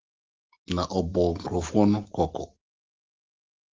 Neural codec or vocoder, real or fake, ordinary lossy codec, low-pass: none; real; Opus, 16 kbps; 7.2 kHz